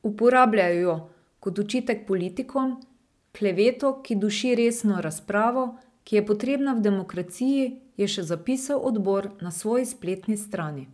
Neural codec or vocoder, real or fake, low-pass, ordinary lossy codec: none; real; none; none